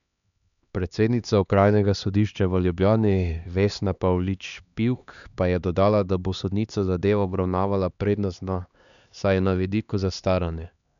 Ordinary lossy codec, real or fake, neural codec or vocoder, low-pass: none; fake; codec, 16 kHz, 2 kbps, X-Codec, HuBERT features, trained on LibriSpeech; 7.2 kHz